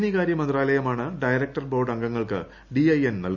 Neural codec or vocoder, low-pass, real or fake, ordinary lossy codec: none; 7.2 kHz; real; none